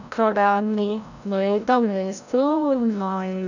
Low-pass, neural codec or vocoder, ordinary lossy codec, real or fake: 7.2 kHz; codec, 16 kHz, 0.5 kbps, FreqCodec, larger model; none; fake